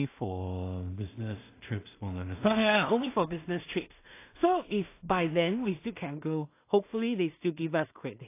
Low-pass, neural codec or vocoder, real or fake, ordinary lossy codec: 3.6 kHz; codec, 16 kHz in and 24 kHz out, 0.4 kbps, LongCat-Audio-Codec, two codebook decoder; fake; AAC, 24 kbps